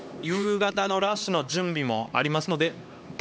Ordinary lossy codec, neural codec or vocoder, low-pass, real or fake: none; codec, 16 kHz, 2 kbps, X-Codec, HuBERT features, trained on LibriSpeech; none; fake